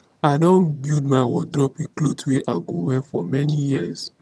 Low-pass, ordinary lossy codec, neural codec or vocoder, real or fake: none; none; vocoder, 22.05 kHz, 80 mel bands, HiFi-GAN; fake